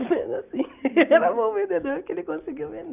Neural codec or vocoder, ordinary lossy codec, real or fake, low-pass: none; none; real; 3.6 kHz